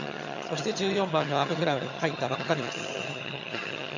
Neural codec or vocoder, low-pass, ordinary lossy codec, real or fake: vocoder, 22.05 kHz, 80 mel bands, HiFi-GAN; 7.2 kHz; none; fake